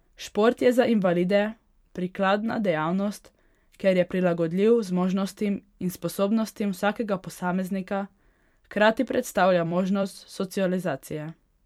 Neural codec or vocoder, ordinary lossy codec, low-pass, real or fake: vocoder, 44.1 kHz, 128 mel bands every 256 samples, BigVGAN v2; MP3, 96 kbps; 19.8 kHz; fake